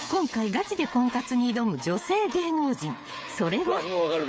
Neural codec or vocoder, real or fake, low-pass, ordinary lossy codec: codec, 16 kHz, 8 kbps, FreqCodec, smaller model; fake; none; none